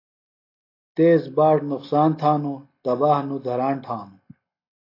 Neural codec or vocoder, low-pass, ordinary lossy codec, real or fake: none; 5.4 kHz; AAC, 32 kbps; real